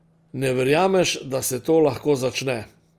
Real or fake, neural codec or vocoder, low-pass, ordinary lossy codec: real; none; 14.4 kHz; Opus, 32 kbps